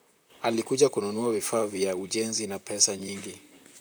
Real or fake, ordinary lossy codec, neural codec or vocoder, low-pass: fake; none; vocoder, 44.1 kHz, 128 mel bands, Pupu-Vocoder; none